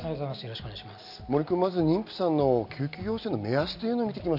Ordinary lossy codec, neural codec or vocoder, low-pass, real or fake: none; none; 5.4 kHz; real